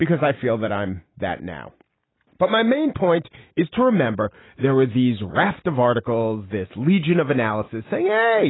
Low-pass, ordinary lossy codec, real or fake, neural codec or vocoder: 7.2 kHz; AAC, 16 kbps; real; none